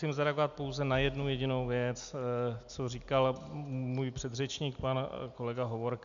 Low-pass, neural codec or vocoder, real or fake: 7.2 kHz; none; real